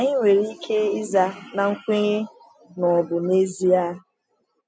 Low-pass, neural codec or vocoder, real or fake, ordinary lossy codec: none; none; real; none